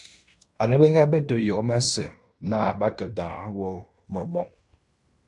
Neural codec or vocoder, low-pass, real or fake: codec, 16 kHz in and 24 kHz out, 0.9 kbps, LongCat-Audio-Codec, fine tuned four codebook decoder; 10.8 kHz; fake